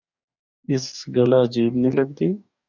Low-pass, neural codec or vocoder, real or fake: 7.2 kHz; codec, 44.1 kHz, 2.6 kbps, DAC; fake